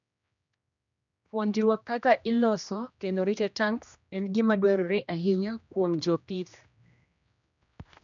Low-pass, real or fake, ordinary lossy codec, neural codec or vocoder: 7.2 kHz; fake; none; codec, 16 kHz, 1 kbps, X-Codec, HuBERT features, trained on general audio